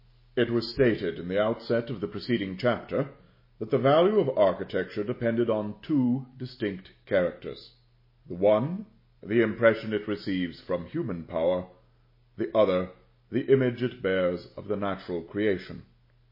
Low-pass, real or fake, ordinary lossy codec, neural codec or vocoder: 5.4 kHz; real; MP3, 24 kbps; none